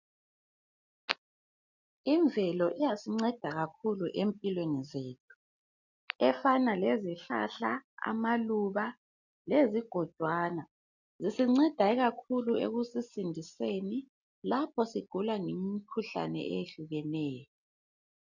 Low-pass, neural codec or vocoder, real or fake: 7.2 kHz; none; real